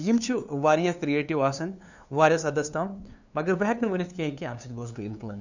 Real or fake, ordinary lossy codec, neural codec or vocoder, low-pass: fake; none; codec, 16 kHz, 2 kbps, FunCodec, trained on LibriTTS, 25 frames a second; 7.2 kHz